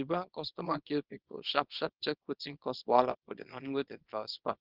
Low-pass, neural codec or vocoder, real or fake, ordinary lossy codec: 5.4 kHz; codec, 24 kHz, 0.9 kbps, WavTokenizer, small release; fake; Opus, 32 kbps